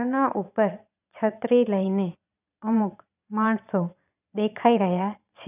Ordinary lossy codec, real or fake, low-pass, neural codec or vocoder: none; fake; 3.6 kHz; vocoder, 22.05 kHz, 80 mel bands, Vocos